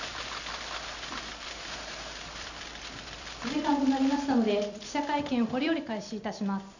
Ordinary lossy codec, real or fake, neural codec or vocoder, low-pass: none; fake; vocoder, 44.1 kHz, 128 mel bands every 256 samples, BigVGAN v2; 7.2 kHz